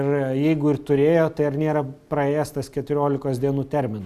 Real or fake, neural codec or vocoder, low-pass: real; none; 14.4 kHz